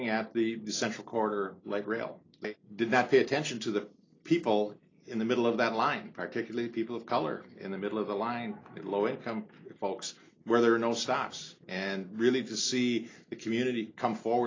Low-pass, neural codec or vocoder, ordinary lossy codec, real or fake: 7.2 kHz; none; AAC, 32 kbps; real